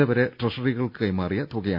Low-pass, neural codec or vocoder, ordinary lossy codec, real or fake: 5.4 kHz; none; none; real